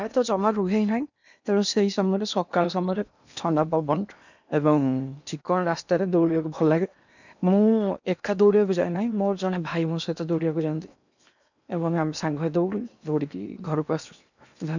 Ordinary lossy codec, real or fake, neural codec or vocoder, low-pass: none; fake; codec, 16 kHz in and 24 kHz out, 0.8 kbps, FocalCodec, streaming, 65536 codes; 7.2 kHz